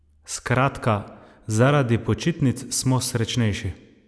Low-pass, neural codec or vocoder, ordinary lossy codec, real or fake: none; none; none; real